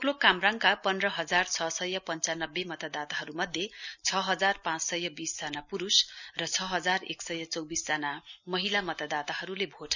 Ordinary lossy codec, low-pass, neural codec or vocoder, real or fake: none; 7.2 kHz; none; real